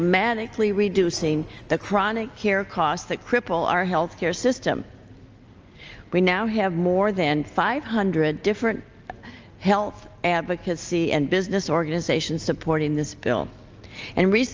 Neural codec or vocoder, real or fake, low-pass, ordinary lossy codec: none; real; 7.2 kHz; Opus, 24 kbps